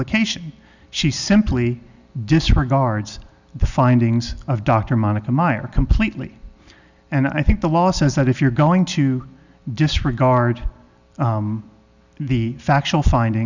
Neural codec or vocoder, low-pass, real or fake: none; 7.2 kHz; real